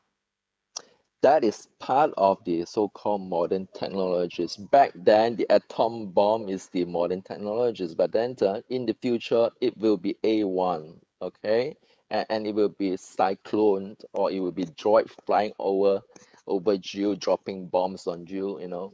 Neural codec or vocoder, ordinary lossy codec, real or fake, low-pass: codec, 16 kHz, 16 kbps, FreqCodec, smaller model; none; fake; none